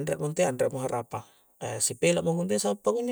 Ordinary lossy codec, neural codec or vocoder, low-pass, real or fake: none; none; none; real